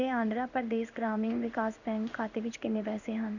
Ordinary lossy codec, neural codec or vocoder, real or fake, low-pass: none; codec, 16 kHz in and 24 kHz out, 1 kbps, XY-Tokenizer; fake; 7.2 kHz